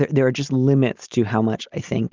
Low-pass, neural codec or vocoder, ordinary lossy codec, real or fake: 7.2 kHz; none; Opus, 32 kbps; real